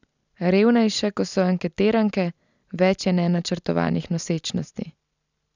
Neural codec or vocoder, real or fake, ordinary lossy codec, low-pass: none; real; none; 7.2 kHz